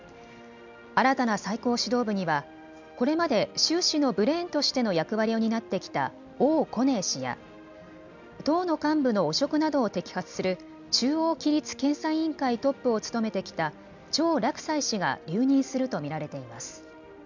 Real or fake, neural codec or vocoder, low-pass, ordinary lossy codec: real; none; 7.2 kHz; none